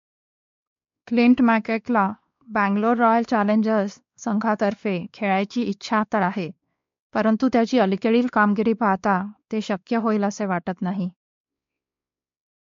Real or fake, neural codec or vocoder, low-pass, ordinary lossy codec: fake; codec, 16 kHz, 2 kbps, X-Codec, WavLM features, trained on Multilingual LibriSpeech; 7.2 kHz; MP3, 48 kbps